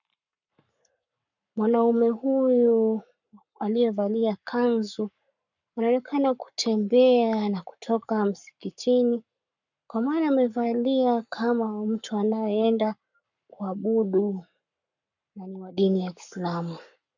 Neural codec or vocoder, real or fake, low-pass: codec, 44.1 kHz, 7.8 kbps, Pupu-Codec; fake; 7.2 kHz